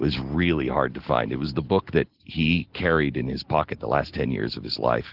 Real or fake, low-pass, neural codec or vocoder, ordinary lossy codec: real; 5.4 kHz; none; Opus, 16 kbps